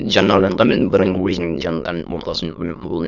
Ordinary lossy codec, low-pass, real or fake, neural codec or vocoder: none; 7.2 kHz; fake; autoencoder, 22.05 kHz, a latent of 192 numbers a frame, VITS, trained on many speakers